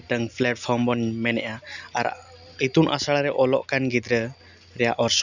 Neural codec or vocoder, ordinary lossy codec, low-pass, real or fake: none; none; 7.2 kHz; real